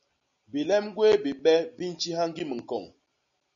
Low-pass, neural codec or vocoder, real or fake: 7.2 kHz; none; real